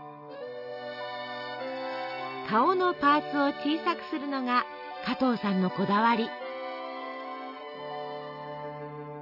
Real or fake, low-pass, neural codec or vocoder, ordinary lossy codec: real; 5.4 kHz; none; none